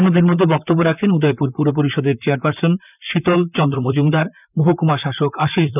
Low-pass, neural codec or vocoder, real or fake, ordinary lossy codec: 3.6 kHz; vocoder, 44.1 kHz, 128 mel bands, Pupu-Vocoder; fake; none